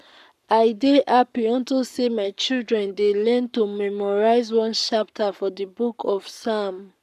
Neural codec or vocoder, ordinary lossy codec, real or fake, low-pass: codec, 44.1 kHz, 7.8 kbps, Pupu-Codec; none; fake; 14.4 kHz